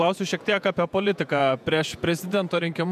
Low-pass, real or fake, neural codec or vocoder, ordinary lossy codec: 14.4 kHz; fake; vocoder, 48 kHz, 128 mel bands, Vocos; MP3, 96 kbps